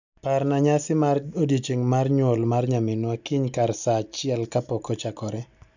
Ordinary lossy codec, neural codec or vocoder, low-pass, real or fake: none; none; 7.2 kHz; real